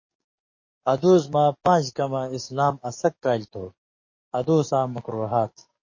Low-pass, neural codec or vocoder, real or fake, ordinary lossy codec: 7.2 kHz; codec, 44.1 kHz, 7.8 kbps, DAC; fake; MP3, 32 kbps